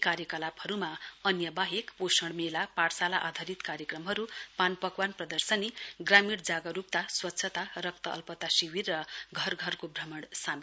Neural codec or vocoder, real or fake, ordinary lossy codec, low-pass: none; real; none; none